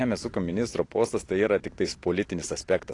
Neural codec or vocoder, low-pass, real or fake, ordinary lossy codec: none; 10.8 kHz; real; AAC, 48 kbps